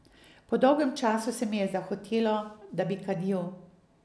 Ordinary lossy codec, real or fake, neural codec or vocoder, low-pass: none; real; none; none